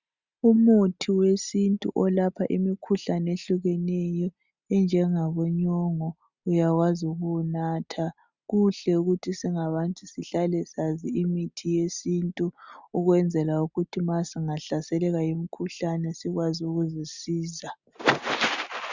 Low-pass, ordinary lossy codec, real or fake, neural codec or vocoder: 7.2 kHz; Opus, 64 kbps; real; none